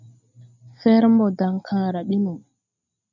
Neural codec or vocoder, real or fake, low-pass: none; real; 7.2 kHz